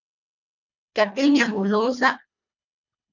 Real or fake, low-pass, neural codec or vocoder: fake; 7.2 kHz; codec, 24 kHz, 1.5 kbps, HILCodec